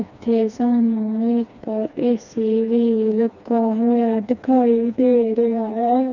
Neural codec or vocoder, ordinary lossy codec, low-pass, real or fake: codec, 16 kHz, 2 kbps, FreqCodec, smaller model; none; 7.2 kHz; fake